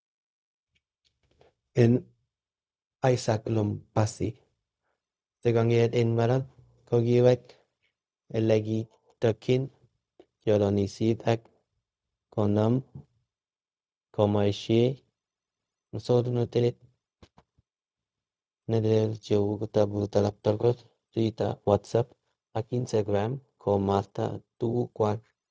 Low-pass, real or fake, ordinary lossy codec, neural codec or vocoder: none; fake; none; codec, 16 kHz, 0.4 kbps, LongCat-Audio-Codec